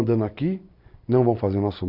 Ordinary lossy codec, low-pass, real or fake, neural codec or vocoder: none; 5.4 kHz; real; none